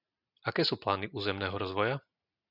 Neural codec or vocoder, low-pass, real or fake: none; 5.4 kHz; real